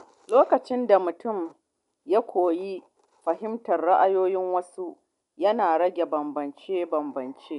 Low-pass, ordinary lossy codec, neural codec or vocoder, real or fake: 10.8 kHz; none; none; real